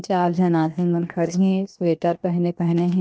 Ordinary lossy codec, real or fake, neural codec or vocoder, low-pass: none; fake; codec, 16 kHz, 0.7 kbps, FocalCodec; none